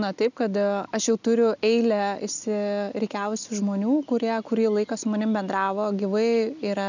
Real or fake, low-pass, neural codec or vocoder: real; 7.2 kHz; none